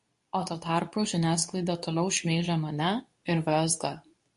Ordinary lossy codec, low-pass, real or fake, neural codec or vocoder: MP3, 48 kbps; 10.8 kHz; fake; codec, 24 kHz, 0.9 kbps, WavTokenizer, medium speech release version 2